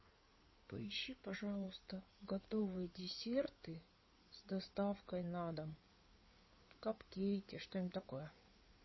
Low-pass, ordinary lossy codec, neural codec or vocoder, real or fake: 7.2 kHz; MP3, 24 kbps; codec, 16 kHz in and 24 kHz out, 2.2 kbps, FireRedTTS-2 codec; fake